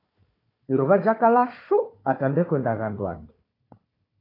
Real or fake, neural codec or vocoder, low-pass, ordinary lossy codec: fake; codec, 16 kHz, 4 kbps, FunCodec, trained on Chinese and English, 50 frames a second; 5.4 kHz; AAC, 24 kbps